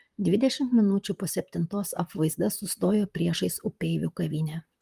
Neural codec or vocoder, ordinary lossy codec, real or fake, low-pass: vocoder, 44.1 kHz, 128 mel bands, Pupu-Vocoder; Opus, 32 kbps; fake; 14.4 kHz